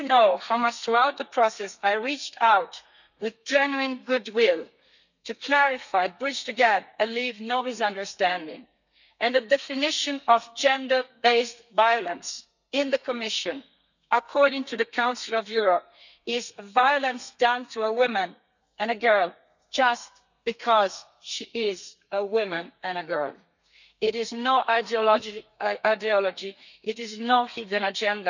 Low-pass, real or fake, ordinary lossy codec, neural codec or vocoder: 7.2 kHz; fake; none; codec, 32 kHz, 1.9 kbps, SNAC